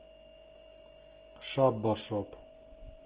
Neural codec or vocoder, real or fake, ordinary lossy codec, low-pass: none; real; Opus, 16 kbps; 3.6 kHz